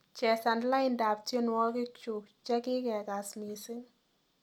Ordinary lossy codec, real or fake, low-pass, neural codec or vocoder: none; real; 19.8 kHz; none